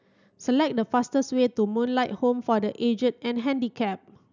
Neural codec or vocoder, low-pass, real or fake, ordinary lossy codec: none; 7.2 kHz; real; none